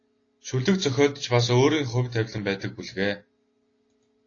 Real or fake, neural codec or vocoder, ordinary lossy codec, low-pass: real; none; AAC, 32 kbps; 7.2 kHz